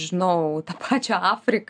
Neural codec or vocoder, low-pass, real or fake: none; 9.9 kHz; real